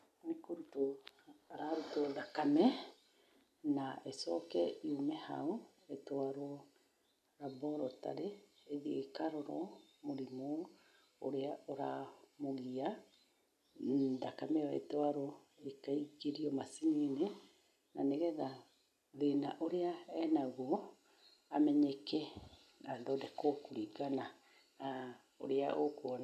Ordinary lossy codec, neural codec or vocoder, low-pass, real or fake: none; none; 14.4 kHz; real